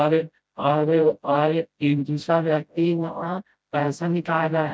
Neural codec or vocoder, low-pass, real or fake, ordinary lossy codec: codec, 16 kHz, 0.5 kbps, FreqCodec, smaller model; none; fake; none